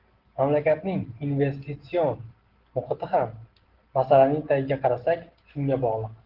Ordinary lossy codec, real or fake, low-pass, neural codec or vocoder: Opus, 16 kbps; real; 5.4 kHz; none